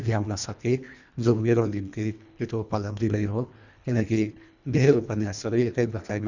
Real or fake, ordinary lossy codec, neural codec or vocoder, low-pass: fake; none; codec, 24 kHz, 1.5 kbps, HILCodec; 7.2 kHz